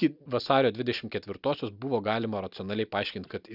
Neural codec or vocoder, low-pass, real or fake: none; 5.4 kHz; real